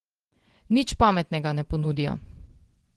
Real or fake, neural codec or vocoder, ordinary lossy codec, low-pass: fake; vocoder, 24 kHz, 100 mel bands, Vocos; Opus, 16 kbps; 10.8 kHz